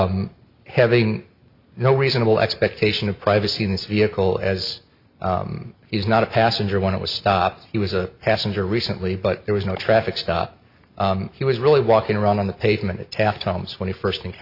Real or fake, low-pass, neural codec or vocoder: real; 5.4 kHz; none